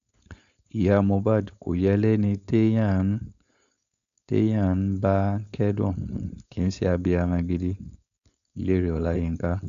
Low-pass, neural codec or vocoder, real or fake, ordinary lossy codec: 7.2 kHz; codec, 16 kHz, 4.8 kbps, FACodec; fake; none